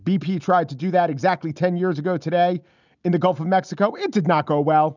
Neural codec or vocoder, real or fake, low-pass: none; real; 7.2 kHz